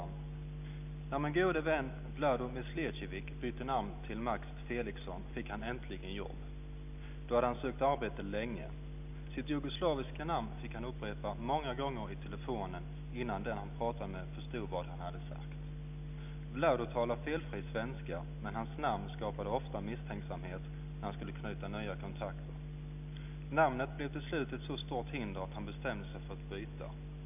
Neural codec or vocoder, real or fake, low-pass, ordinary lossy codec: none; real; 3.6 kHz; none